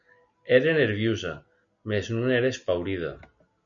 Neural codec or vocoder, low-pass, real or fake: none; 7.2 kHz; real